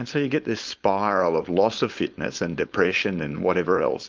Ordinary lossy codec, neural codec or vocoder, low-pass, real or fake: Opus, 24 kbps; codec, 16 kHz, 4.8 kbps, FACodec; 7.2 kHz; fake